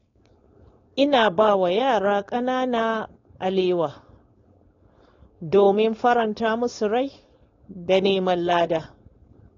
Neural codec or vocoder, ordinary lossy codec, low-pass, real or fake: codec, 16 kHz, 4.8 kbps, FACodec; AAC, 32 kbps; 7.2 kHz; fake